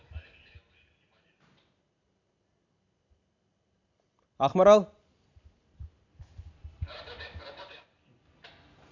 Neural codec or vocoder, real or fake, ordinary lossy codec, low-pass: none; real; none; 7.2 kHz